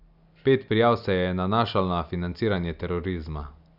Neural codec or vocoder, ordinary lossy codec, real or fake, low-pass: none; none; real; 5.4 kHz